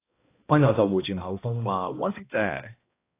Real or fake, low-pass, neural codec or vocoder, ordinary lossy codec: fake; 3.6 kHz; codec, 16 kHz, 1 kbps, X-Codec, HuBERT features, trained on balanced general audio; AAC, 24 kbps